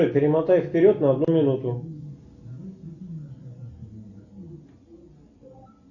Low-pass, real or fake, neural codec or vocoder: 7.2 kHz; real; none